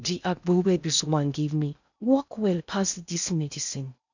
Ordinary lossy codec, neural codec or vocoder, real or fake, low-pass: none; codec, 16 kHz in and 24 kHz out, 0.6 kbps, FocalCodec, streaming, 2048 codes; fake; 7.2 kHz